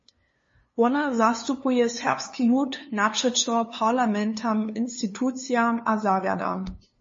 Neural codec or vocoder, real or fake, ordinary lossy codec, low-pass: codec, 16 kHz, 2 kbps, FunCodec, trained on LibriTTS, 25 frames a second; fake; MP3, 32 kbps; 7.2 kHz